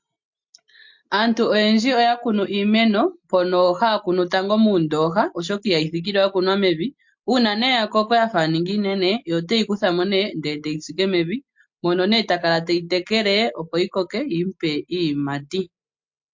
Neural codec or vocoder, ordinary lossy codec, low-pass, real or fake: none; MP3, 48 kbps; 7.2 kHz; real